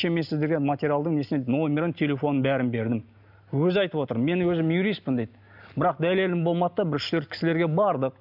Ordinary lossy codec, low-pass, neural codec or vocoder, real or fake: none; 5.4 kHz; none; real